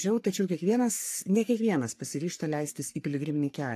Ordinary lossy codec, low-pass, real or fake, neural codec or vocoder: AAC, 64 kbps; 14.4 kHz; fake; codec, 44.1 kHz, 3.4 kbps, Pupu-Codec